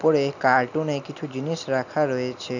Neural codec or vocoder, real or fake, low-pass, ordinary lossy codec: none; real; 7.2 kHz; none